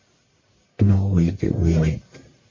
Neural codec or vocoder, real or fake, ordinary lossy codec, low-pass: codec, 44.1 kHz, 1.7 kbps, Pupu-Codec; fake; MP3, 32 kbps; 7.2 kHz